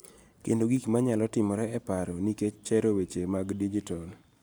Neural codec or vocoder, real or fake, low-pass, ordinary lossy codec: none; real; none; none